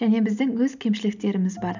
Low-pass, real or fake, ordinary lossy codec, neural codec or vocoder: 7.2 kHz; real; none; none